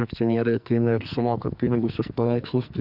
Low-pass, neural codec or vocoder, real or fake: 5.4 kHz; codec, 44.1 kHz, 2.6 kbps, SNAC; fake